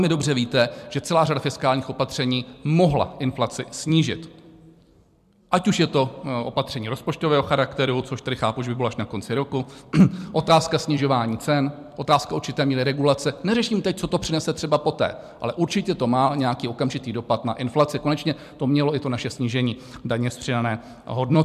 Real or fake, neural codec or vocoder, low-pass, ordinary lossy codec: fake; vocoder, 44.1 kHz, 128 mel bands every 256 samples, BigVGAN v2; 14.4 kHz; MP3, 96 kbps